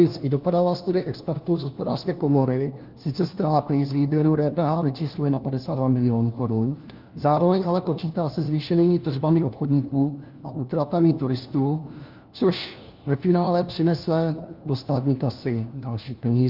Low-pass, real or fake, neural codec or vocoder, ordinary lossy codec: 5.4 kHz; fake; codec, 16 kHz, 1 kbps, FunCodec, trained on LibriTTS, 50 frames a second; Opus, 24 kbps